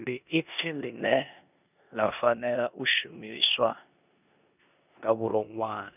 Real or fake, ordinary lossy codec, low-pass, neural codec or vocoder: fake; none; 3.6 kHz; codec, 16 kHz in and 24 kHz out, 0.9 kbps, LongCat-Audio-Codec, four codebook decoder